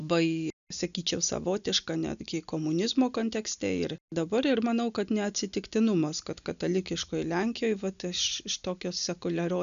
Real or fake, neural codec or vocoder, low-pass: real; none; 7.2 kHz